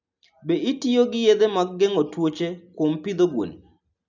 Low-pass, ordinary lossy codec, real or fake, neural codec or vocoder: 7.2 kHz; none; real; none